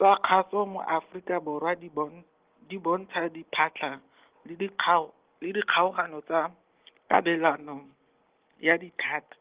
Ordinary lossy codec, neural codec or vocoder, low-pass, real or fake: Opus, 16 kbps; none; 3.6 kHz; real